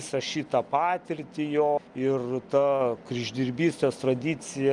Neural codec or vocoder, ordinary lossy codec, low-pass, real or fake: none; Opus, 32 kbps; 10.8 kHz; real